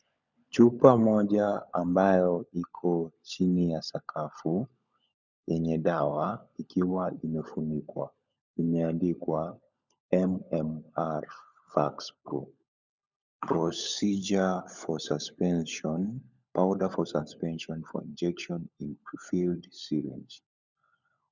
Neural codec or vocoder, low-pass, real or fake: codec, 16 kHz, 16 kbps, FunCodec, trained on LibriTTS, 50 frames a second; 7.2 kHz; fake